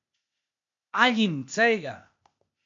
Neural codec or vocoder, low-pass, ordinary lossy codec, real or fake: codec, 16 kHz, 0.8 kbps, ZipCodec; 7.2 kHz; MP3, 64 kbps; fake